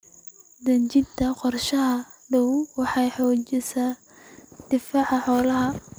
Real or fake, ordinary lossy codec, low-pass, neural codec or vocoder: real; none; none; none